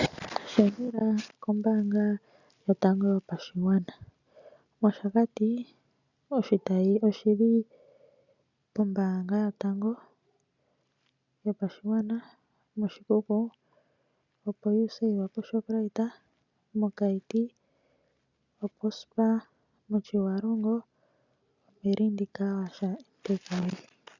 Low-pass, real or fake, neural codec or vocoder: 7.2 kHz; real; none